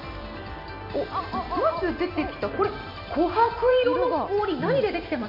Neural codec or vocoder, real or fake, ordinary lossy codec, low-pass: vocoder, 44.1 kHz, 128 mel bands every 256 samples, BigVGAN v2; fake; none; 5.4 kHz